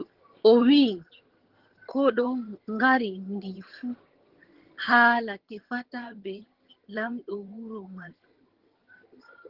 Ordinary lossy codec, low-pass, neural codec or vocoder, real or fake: Opus, 16 kbps; 5.4 kHz; vocoder, 22.05 kHz, 80 mel bands, HiFi-GAN; fake